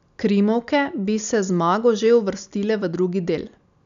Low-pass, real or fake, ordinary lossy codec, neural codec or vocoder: 7.2 kHz; real; none; none